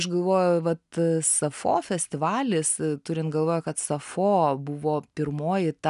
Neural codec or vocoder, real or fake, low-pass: none; real; 10.8 kHz